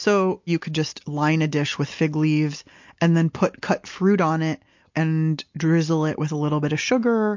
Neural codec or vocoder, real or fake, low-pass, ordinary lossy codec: none; real; 7.2 kHz; MP3, 48 kbps